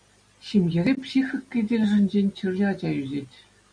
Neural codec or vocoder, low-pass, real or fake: none; 9.9 kHz; real